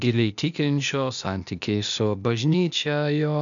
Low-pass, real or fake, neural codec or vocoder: 7.2 kHz; fake; codec, 16 kHz, 0.8 kbps, ZipCodec